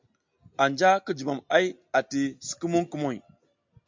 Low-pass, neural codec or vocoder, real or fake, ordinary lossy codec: 7.2 kHz; none; real; MP3, 64 kbps